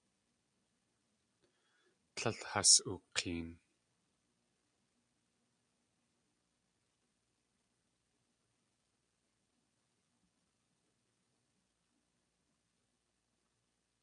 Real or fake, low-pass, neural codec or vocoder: real; 9.9 kHz; none